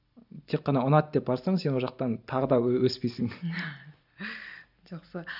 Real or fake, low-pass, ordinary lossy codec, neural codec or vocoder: real; 5.4 kHz; none; none